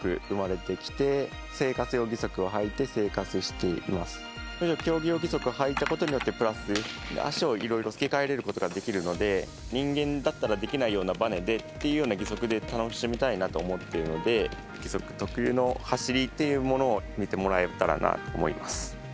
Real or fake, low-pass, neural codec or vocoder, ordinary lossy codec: real; none; none; none